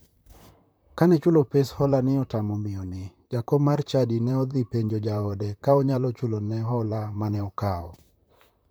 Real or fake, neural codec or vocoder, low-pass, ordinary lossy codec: fake; vocoder, 44.1 kHz, 128 mel bands, Pupu-Vocoder; none; none